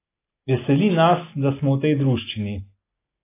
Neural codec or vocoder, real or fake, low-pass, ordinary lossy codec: none; real; 3.6 kHz; AAC, 24 kbps